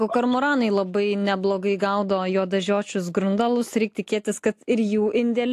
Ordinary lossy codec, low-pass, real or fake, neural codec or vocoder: AAC, 64 kbps; 14.4 kHz; real; none